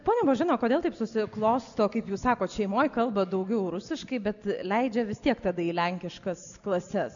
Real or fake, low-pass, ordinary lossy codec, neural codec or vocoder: real; 7.2 kHz; MP3, 96 kbps; none